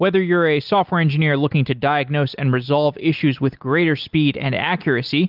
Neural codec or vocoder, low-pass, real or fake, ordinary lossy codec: none; 5.4 kHz; real; Opus, 32 kbps